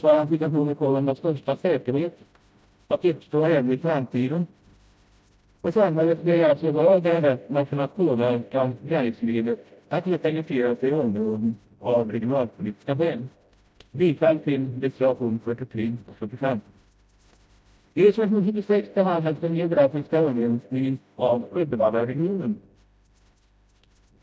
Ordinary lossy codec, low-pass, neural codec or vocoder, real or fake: none; none; codec, 16 kHz, 0.5 kbps, FreqCodec, smaller model; fake